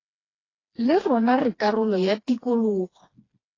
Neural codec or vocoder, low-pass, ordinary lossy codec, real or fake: codec, 16 kHz, 2 kbps, FreqCodec, smaller model; 7.2 kHz; AAC, 32 kbps; fake